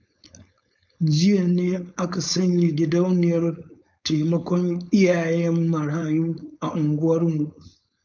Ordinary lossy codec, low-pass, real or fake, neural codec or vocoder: none; 7.2 kHz; fake; codec, 16 kHz, 4.8 kbps, FACodec